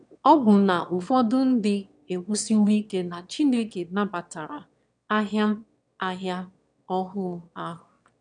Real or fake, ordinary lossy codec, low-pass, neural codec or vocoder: fake; none; 9.9 kHz; autoencoder, 22.05 kHz, a latent of 192 numbers a frame, VITS, trained on one speaker